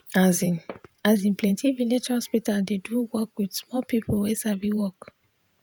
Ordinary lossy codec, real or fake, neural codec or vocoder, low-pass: none; fake; vocoder, 48 kHz, 128 mel bands, Vocos; none